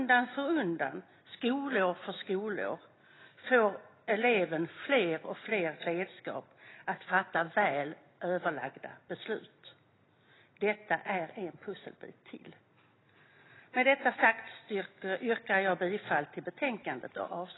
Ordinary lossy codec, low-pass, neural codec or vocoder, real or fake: AAC, 16 kbps; 7.2 kHz; none; real